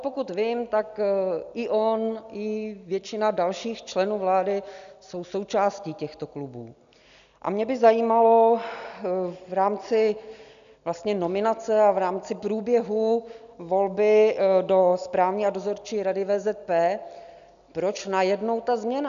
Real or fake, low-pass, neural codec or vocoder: real; 7.2 kHz; none